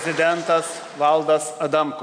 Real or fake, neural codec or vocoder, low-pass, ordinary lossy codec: real; none; 9.9 kHz; MP3, 64 kbps